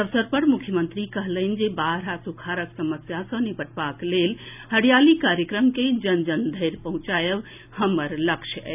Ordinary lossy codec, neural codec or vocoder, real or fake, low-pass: none; none; real; 3.6 kHz